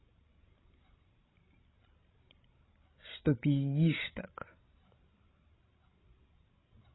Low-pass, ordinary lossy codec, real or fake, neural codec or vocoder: 7.2 kHz; AAC, 16 kbps; fake; codec, 16 kHz, 16 kbps, FreqCodec, larger model